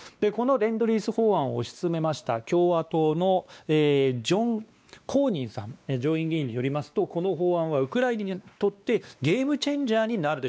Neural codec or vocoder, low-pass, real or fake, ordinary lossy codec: codec, 16 kHz, 2 kbps, X-Codec, WavLM features, trained on Multilingual LibriSpeech; none; fake; none